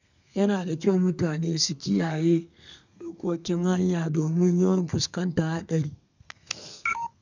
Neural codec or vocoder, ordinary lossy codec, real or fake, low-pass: codec, 32 kHz, 1.9 kbps, SNAC; none; fake; 7.2 kHz